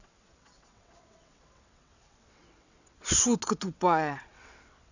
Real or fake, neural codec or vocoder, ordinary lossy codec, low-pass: fake; vocoder, 22.05 kHz, 80 mel bands, WaveNeXt; none; 7.2 kHz